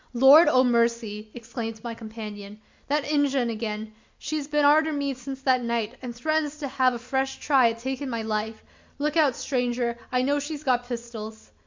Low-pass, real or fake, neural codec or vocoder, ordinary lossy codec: 7.2 kHz; real; none; MP3, 64 kbps